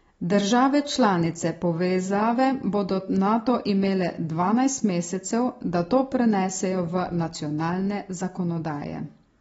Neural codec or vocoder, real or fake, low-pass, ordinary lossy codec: none; real; 19.8 kHz; AAC, 24 kbps